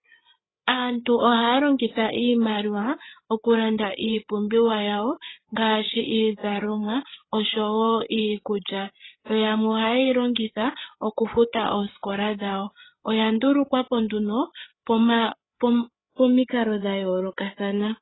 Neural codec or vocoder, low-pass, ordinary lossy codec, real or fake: codec, 16 kHz, 8 kbps, FreqCodec, larger model; 7.2 kHz; AAC, 16 kbps; fake